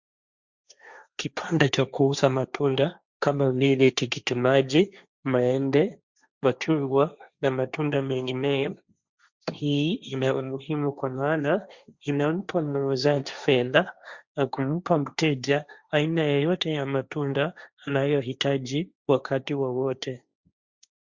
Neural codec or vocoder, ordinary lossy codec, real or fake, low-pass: codec, 16 kHz, 1.1 kbps, Voila-Tokenizer; Opus, 64 kbps; fake; 7.2 kHz